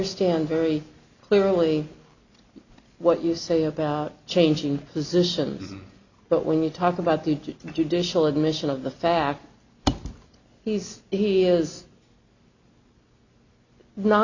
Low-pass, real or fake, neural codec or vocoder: 7.2 kHz; real; none